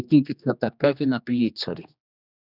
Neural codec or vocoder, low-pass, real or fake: codec, 16 kHz, 2 kbps, X-Codec, HuBERT features, trained on general audio; 5.4 kHz; fake